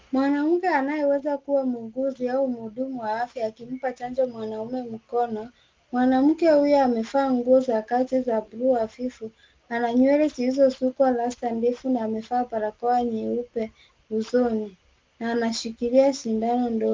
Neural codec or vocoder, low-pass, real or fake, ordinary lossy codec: none; 7.2 kHz; real; Opus, 32 kbps